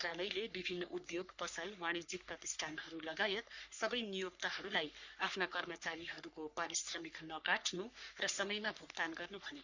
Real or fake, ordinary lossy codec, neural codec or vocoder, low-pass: fake; Opus, 64 kbps; codec, 44.1 kHz, 3.4 kbps, Pupu-Codec; 7.2 kHz